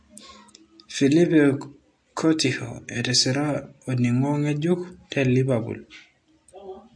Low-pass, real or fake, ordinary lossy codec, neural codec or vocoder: 9.9 kHz; real; MP3, 48 kbps; none